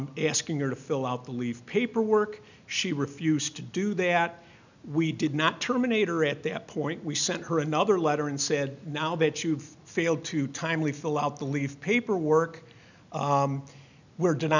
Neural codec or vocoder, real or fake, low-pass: none; real; 7.2 kHz